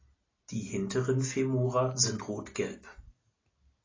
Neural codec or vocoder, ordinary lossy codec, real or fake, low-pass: none; AAC, 32 kbps; real; 7.2 kHz